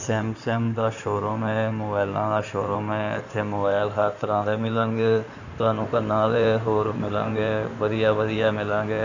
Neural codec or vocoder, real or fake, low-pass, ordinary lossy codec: codec, 16 kHz in and 24 kHz out, 2.2 kbps, FireRedTTS-2 codec; fake; 7.2 kHz; none